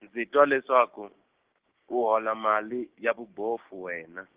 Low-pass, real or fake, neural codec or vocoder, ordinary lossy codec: 3.6 kHz; fake; codec, 16 kHz, 6 kbps, DAC; Opus, 16 kbps